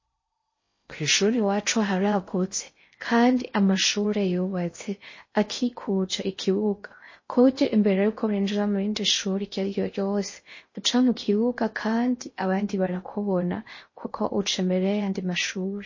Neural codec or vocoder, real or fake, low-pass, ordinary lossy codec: codec, 16 kHz in and 24 kHz out, 0.6 kbps, FocalCodec, streaming, 4096 codes; fake; 7.2 kHz; MP3, 32 kbps